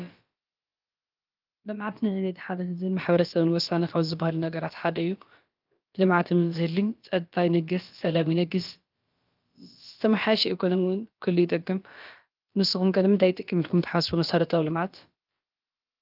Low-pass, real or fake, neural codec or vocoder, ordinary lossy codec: 5.4 kHz; fake; codec, 16 kHz, about 1 kbps, DyCAST, with the encoder's durations; Opus, 24 kbps